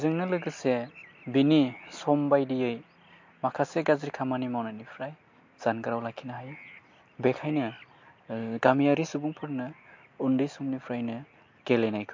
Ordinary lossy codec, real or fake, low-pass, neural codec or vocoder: MP3, 48 kbps; real; 7.2 kHz; none